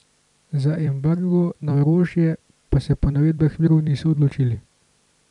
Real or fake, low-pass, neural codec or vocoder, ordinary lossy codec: fake; 10.8 kHz; vocoder, 44.1 kHz, 128 mel bands every 256 samples, BigVGAN v2; none